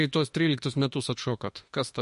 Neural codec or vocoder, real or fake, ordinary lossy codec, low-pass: autoencoder, 48 kHz, 32 numbers a frame, DAC-VAE, trained on Japanese speech; fake; MP3, 48 kbps; 14.4 kHz